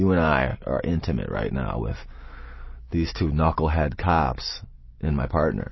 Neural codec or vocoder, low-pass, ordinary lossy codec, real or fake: none; 7.2 kHz; MP3, 24 kbps; real